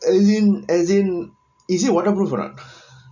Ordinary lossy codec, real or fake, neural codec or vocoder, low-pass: none; real; none; 7.2 kHz